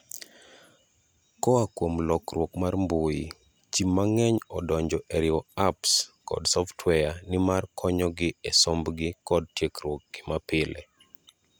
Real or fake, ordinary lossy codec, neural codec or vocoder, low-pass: real; none; none; none